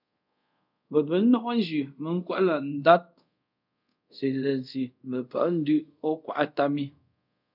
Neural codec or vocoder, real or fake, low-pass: codec, 24 kHz, 0.5 kbps, DualCodec; fake; 5.4 kHz